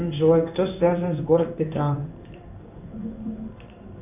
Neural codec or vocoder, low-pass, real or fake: codec, 16 kHz in and 24 kHz out, 1 kbps, XY-Tokenizer; 3.6 kHz; fake